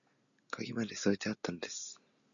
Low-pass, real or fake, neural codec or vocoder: 7.2 kHz; real; none